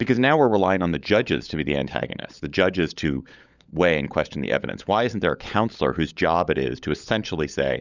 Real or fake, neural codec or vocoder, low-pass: fake; codec, 16 kHz, 16 kbps, FunCodec, trained on LibriTTS, 50 frames a second; 7.2 kHz